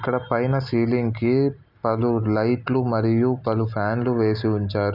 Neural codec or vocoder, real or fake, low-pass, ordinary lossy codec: none; real; 5.4 kHz; none